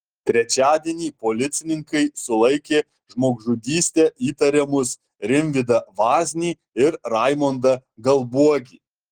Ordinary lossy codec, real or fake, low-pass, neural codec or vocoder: Opus, 16 kbps; real; 19.8 kHz; none